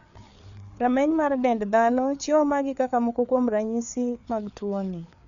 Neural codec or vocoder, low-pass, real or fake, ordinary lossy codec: codec, 16 kHz, 4 kbps, FreqCodec, larger model; 7.2 kHz; fake; none